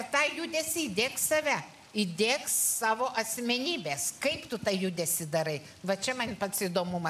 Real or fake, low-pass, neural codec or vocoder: real; 14.4 kHz; none